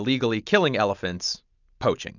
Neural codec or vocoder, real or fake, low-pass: none; real; 7.2 kHz